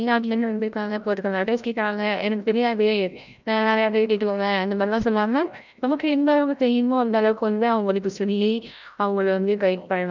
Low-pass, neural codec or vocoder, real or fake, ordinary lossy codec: 7.2 kHz; codec, 16 kHz, 0.5 kbps, FreqCodec, larger model; fake; none